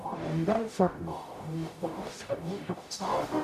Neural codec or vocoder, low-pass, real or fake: codec, 44.1 kHz, 0.9 kbps, DAC; 14.4 kHz; fake